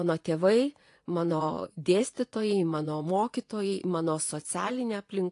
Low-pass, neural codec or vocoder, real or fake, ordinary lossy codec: 10.8 kHz; vocoder, 24 kHz, 100 mel bands, Vocos; fake; AAC, 48 kbps